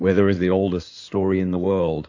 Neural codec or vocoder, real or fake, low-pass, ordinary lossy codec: codec, 16 kHz in and 24 kHz out, 2.2 kbps, FireRedTTS-2 codec; fake; 7.2 kHz; AAC, 48 kbps